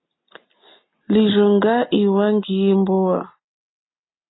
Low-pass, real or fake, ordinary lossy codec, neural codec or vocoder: 7.2 kHz; real; AAC, 16 kbps; none